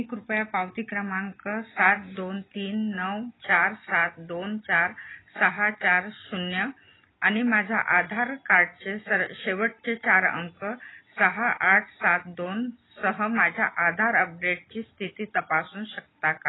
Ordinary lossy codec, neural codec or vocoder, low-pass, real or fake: AAC, 16 kbps; none; 7.2 kHz; real